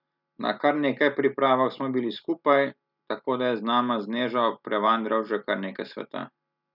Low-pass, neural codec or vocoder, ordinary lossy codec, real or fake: 5.4 kHz; none; none; real